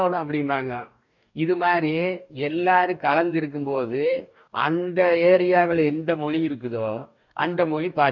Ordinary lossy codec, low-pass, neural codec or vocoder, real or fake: none; 7.2 kHz; codec, 44.1 kHz, 2.6 kbps, DAC; fake